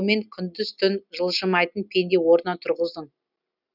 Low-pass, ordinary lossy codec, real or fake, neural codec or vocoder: 5.4 kHz; none; real; none